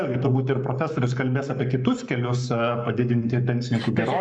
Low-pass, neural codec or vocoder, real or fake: 9.9 kHz; codec, 44.1 kHz, 7.8 kbps, Pupu-Codec; fake